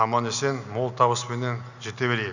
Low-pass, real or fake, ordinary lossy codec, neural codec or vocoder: 7.2 kHz; real; none; none